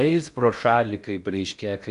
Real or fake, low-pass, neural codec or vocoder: fake; 10.8 kHz; codec, 16 kHz in and 24 kHz out, 0.6 kbps, FocalCodec, streaming, 4096 codes